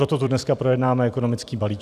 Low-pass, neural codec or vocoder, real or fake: 14.4 kHz; vocoder, 44.1 kHz, 128 mel bands every 256 samples, BigVGAN v2; fake